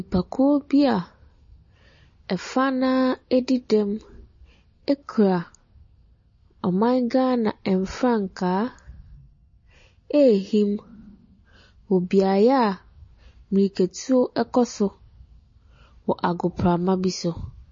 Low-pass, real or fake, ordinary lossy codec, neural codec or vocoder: 7.2 kHz; real; MP3, 32 kbps; none